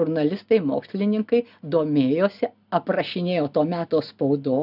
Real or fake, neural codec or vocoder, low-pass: real; none; 5.4 kHz